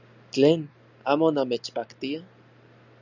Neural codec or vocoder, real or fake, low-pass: none; real; 7.2 kHz